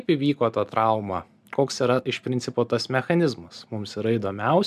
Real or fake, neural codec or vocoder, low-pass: real; none; 14.4 kHz